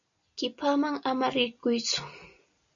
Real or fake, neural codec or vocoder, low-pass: real; none; 7.2 kHz